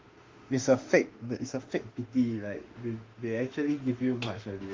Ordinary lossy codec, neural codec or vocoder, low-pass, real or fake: Opus, 32 kbps; autoencoder, 48 kHz, 32 numbers a frame, DAC-VAE, trained on Japanese speech; 7.2 kHz; fake